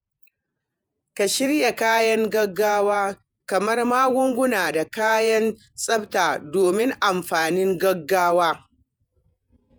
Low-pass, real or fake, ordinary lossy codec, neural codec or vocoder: none; fake; none; vocoder, 48 kHz, 128 mel bands, Vocos